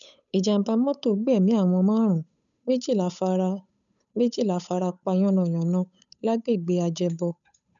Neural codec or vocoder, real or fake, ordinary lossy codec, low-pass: codec, 16 kHz, 8 kbps, FunCodec, trained on Chinese and English, 25 frames a second; fake; none; 7.2 kHz